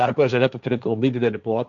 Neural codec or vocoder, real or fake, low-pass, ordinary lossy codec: codec, 16 kHz, 1.1 kbps, Voila-Tokenizer; fake; 7.2 kHz; MP3, 96 kbps